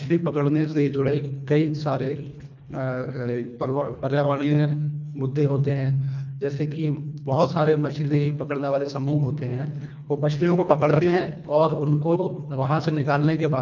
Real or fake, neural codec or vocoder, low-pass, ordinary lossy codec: fake; codec, 24 kHz, 1.5 kbps, HILCodec; 7.2 kHz; none